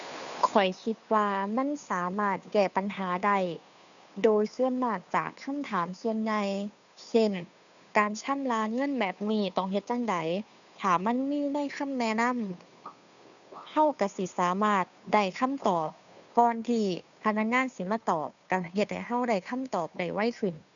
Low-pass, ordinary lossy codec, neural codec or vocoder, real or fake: 7.2 kHz; none; codec, 16 kHz, 2 kbps, FunCodec, trained on Chinese and English, 25 frames a second; fake